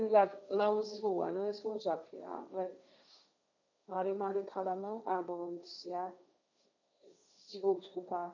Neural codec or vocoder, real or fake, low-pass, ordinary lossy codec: codec, 16 kHz, 1.1 kbps, Voila-Tokenizer; fake; 7.2 kHz; none